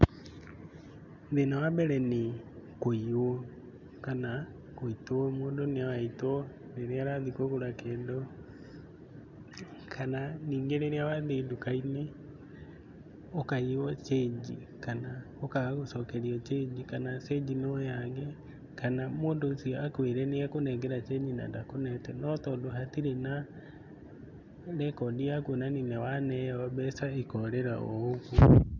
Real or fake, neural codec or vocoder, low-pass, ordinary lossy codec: real; none; 7.2 kHz; none